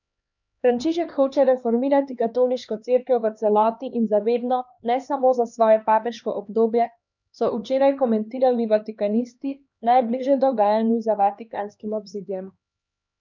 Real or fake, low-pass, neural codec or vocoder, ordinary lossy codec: fake; 7.2 kHz; codec, 16 kHz, 2 kbps, X-Codec, HuBERT features, trained on LibriSpeech; none